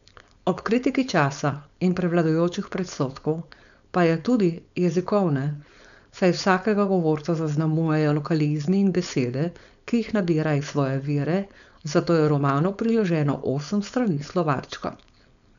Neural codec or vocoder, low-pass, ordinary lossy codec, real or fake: codec, 16 kHz, 4.8 kbps, FACodec; 7.2 kHz; none; fake